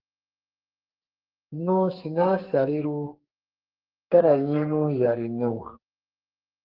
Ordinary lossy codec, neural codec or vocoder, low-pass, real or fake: Opus, 16 kbps; codec, 44.1 kHz, 2.6 kbps, SNAC; 5.4 kHz; fake